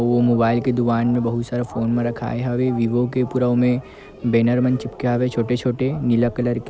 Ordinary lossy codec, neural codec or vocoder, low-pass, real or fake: none; none; none; real